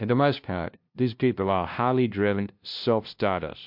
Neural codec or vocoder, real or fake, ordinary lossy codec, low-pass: codec, 16 kHz, 0.5 kbps, FunCodec, trained on LibriTTS, 25 frames a second; fake; MP3, 48 kbps; 5.4 kHz